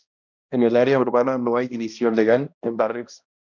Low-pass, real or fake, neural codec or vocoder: 7.2 kHz; fake; codec, 16 kHz, 1 kbps, X-Codec, HuBERT features, trained on balanced general audio